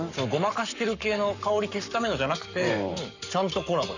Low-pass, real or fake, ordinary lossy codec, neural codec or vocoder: 7.2 kHz; fake; none; codec, 44.1 kHz, 7.8 kbps, Pupu-Codec